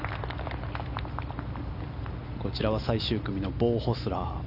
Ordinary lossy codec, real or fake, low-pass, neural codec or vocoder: none; real; 5.4 kHz; none